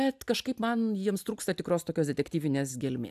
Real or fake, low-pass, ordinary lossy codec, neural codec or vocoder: real; 14.4 kHz; AAC, 96 kbps; none